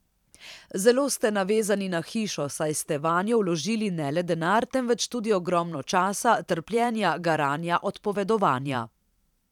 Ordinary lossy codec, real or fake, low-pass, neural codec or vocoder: none; fake; 19.8 kHz; vocoder, 44.1 kHz, 128 mel bands every 512 samples, BigVGAN v2